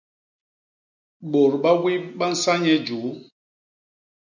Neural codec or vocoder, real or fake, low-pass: none; real; 7.2 kHz